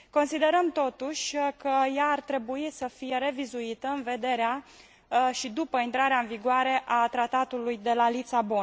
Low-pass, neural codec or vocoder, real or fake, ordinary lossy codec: none; none; real; none